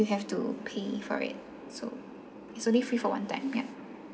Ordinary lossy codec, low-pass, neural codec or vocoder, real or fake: none; none; none; real